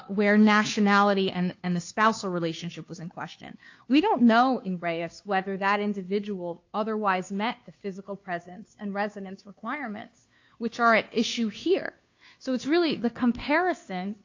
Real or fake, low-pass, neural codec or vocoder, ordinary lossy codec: fake; 7.2 kHz; codec, 16 kHz, 2 kbps, FunCodec, trained on Chinese and English, 25 frames a second; AAC, 48 kbps